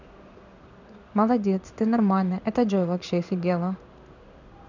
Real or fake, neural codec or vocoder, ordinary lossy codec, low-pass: fake; codec, 16 kHz in and 24 kHz out, 1 kbps, XY-Tokenizer; none; 7.2 kHz